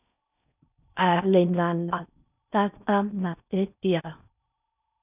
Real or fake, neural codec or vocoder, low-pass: fake; codec, 16 kHz in and 24 kHz out, 0.6 kbps, FocalCodec, streaming, 4096 codes; 3.6 kHz